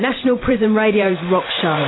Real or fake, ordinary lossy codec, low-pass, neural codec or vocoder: fake; AAC, 16 kbps; 7.2 kHz; vocoder, 44.1 kHz, 128 mel bands every 512 samples, BigVGAN v2